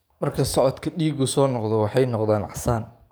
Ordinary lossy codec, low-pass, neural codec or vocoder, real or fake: none; none; codec, 44.1 kHz, 7.8 kbps, DAC; fake